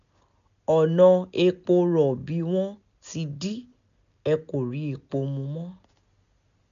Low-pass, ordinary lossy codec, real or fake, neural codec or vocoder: 7.2 kHz; none; real; none